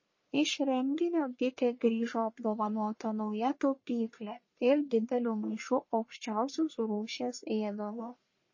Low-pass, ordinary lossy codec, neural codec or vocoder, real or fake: 7.2 kHz; MP3, 32 kbps; codec, 44.1 kHz, 1.7 kbps, Pupu-Codec; fake